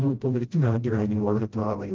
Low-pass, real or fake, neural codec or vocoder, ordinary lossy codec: 7.2 kHz; fake; codec, 16 kHz, 0.5 kbps, FreqCodec, smaller model; Opus, 16 kbps